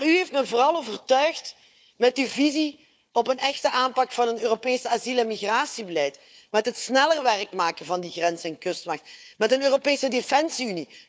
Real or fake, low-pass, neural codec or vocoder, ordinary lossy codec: fake; none; codec, 16 kHz, 4 kbps, FunCodec, trained on Chinese and English, 50 frames a second; none